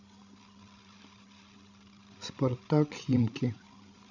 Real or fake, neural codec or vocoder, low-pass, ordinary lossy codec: fake; codec, 16 kHz, 16 kbps, FreqCodec, larger model; 7.2 kHz; none